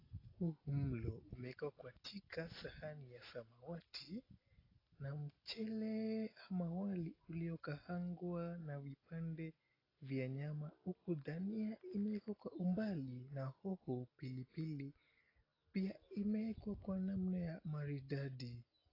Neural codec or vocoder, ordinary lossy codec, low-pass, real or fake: none; AAC, 24 kbps; 5.4 kHz; real